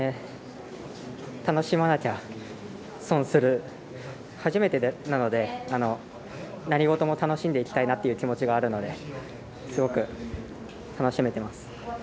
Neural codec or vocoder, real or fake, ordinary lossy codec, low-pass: none; real; none; none